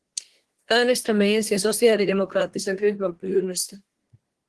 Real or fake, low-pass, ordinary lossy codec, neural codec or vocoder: fake; 10.8 kHz; Opus, 16 kbps; codec, 24 kHz, 1 kbps, SNAC